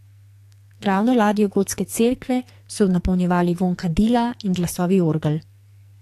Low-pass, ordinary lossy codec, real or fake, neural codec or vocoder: 14.4 kHz; AAC, 64 kbps; fake; codec, 44.1 kHz, 2.6 kbps, SNAC